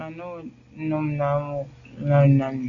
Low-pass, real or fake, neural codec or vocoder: 7.2 kHz; real; none